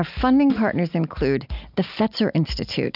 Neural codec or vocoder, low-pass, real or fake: none; 5.4 kHz; real